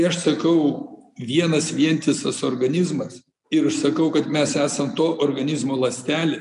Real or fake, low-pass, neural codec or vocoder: real; 10.8 kHz; none